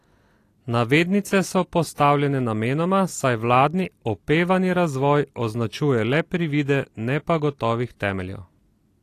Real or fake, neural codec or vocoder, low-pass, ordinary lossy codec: real; none; 14.4 kHz; AAC, 48 kbps